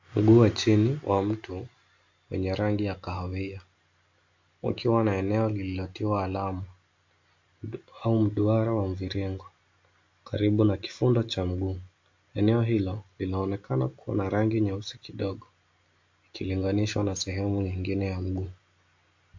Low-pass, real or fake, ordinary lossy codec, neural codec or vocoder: 7.2 kHz; real; MP3, 48 kbps; none